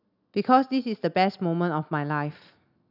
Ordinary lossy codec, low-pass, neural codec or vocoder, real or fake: none; 5.4 kHz; none; real